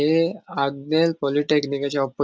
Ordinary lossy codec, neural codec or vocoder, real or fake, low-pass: none; none; real; none